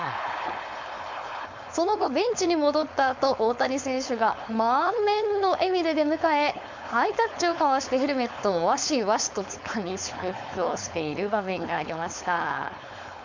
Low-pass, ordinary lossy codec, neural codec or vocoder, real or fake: 7.2 kHz; MP3, 64 kbps; codec, 16 kHz, 4.8 kbps, FACodec; fake